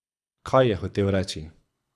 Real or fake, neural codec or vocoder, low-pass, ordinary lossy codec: fake; codec, 24 kHz, 6 kbps, HILCodec; none; none